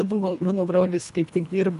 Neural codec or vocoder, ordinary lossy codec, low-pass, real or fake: codec, 24 kHz, 1.5 kbps, HILCodec; AAC, 96 kbps; 10.8 kHz; fake